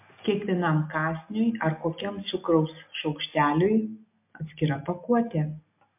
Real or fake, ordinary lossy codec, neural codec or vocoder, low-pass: real; MP3, 32 kbps; none; 3.6 kHz